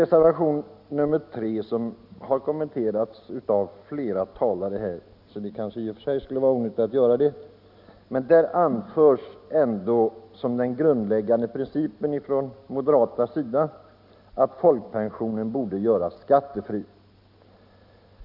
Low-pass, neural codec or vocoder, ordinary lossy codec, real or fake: 5.4 kHz; none; none; real